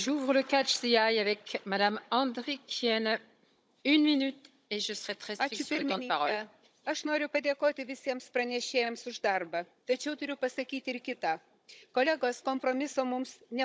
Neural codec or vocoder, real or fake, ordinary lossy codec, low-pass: codec, 16 kHz, 16 kbps, FunCodec, trained on Chinese and English, 50 frames a second; fake; none; none